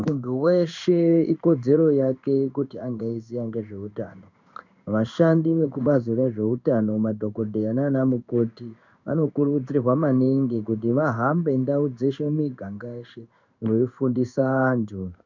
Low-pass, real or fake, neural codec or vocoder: 7.2 kHz; fake; codec, 16 kHz in and 24 kHz out, 1 kbps, XY-Tokenizer